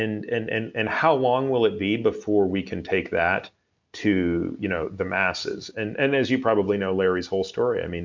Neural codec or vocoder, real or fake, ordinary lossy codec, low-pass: none; real; MP3, 64 kbps; 7.2 kHz